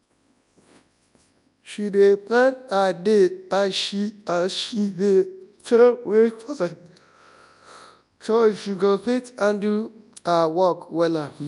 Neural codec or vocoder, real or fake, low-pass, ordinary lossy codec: codec, 24 kHz, 0.9 kbps, WavTokenizer, large speech release; fake; 10.8 kHz; none